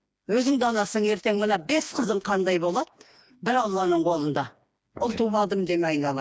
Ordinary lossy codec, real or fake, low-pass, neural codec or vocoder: none; fake; none; codec, 16 kHz, 2 kbps, FreqCodec, smaller model